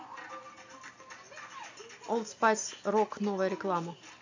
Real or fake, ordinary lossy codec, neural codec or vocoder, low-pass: real; AAC, 48 kbps; none; 7.2 kHz